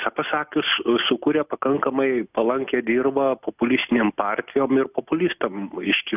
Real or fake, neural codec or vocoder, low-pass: real; none; 3.6 kHz